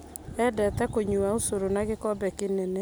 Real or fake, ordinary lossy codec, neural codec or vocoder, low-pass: real; none; none; none